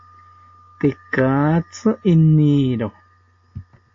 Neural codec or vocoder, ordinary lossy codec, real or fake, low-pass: none; AAC, 48 kbps; real; 7.2 kHz